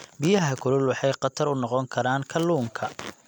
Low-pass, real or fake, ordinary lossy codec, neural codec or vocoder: 19.8 kHz; real; none; none